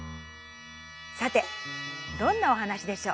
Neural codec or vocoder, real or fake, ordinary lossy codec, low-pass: none; real; none; none